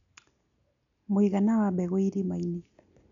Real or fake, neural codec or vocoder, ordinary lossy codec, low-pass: real; none; none; 7.2 kHz